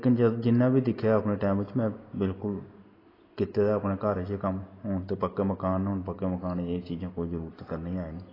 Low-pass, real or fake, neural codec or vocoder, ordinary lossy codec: 5.4 kHz; real; none; AAC, 24 kbps